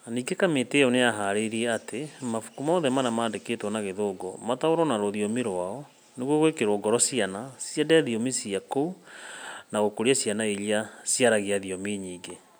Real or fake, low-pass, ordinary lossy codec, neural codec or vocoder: real; none; none; none